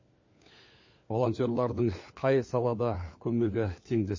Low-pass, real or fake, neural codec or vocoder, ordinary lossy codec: 7.2 kHz; fake; codec, 16 kHz, 4 kbps, FunCodec, trained on LibriTTS, 50 frames a second; MP3, 32 kbps